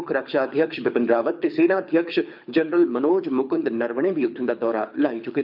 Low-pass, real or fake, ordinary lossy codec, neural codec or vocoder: 5.4 kHz; fake; none; codec, 24 kHz, 6 kbps, HILCodec